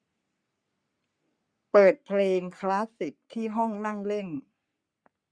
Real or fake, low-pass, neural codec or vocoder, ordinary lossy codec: fake; 9.9 kHz; codec, 44.1 kHz, 3.4 kbps, Pupu-Codec; Opus, 64 kbps